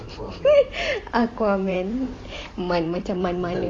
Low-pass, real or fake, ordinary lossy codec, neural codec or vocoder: 9.9 kHz; fake; AAC, 32 kbps; vocoder, 44.1 kHz, 128 mel bands every 512 samples, BigVGAN v2